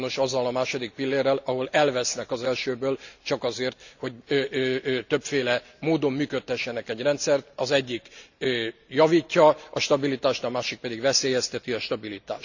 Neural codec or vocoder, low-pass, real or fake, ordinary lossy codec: none; 7.2 kHz; real; none